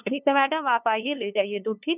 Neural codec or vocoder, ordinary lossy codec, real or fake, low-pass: codec, 16 kHz, 2 kbps, FunCodec, trained on LibriTTS, 25 frames a second; none; fake; 3.6 kHz